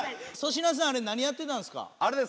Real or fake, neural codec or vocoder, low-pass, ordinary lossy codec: real; none; none; none